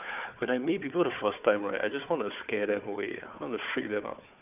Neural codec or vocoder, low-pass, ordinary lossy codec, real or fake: codec, 16 kHz, 4 kbps, FunCodec, trained on Chinese and English, 50 frames a second; 3.6 kHz; none; fake